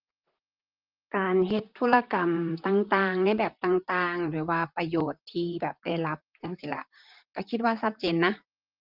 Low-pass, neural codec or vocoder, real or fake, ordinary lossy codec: 5.4 kHz; vocoder, 44.1 kHz, 128 mel bands, Pupu-Vocoder; fake; Opus, 32 kbps